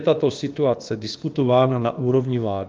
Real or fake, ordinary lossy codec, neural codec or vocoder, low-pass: fake; Opus, 32 kbps; codec, 16 kHz, about 1 kbps, DyCAST, with the encoder's durations; 7.2 kHz